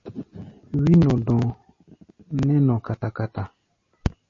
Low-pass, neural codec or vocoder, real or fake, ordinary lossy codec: 7.2 kHz; none; real; MP3, 32 kbps